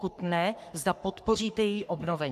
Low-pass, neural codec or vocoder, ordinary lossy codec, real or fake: 14.4 kHz; codec, 44.1 kHz, 3.4 kbps, Pupu-Codec; AAC, 96 kbps; fake